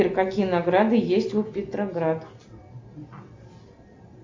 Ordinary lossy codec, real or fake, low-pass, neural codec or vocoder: MP3, 64 kbps; real; 7.2 kHz; none